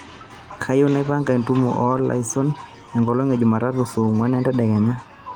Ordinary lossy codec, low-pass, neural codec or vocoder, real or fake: Opus, 24 kbps; 19.8 kHz; none; real